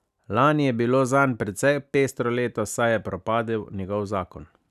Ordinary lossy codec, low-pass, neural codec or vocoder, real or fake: none; 14.4 kHz; none; real